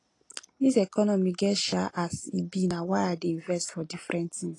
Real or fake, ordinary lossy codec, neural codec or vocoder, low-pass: real; AAC, 32 kbps; none; 10.8 kHz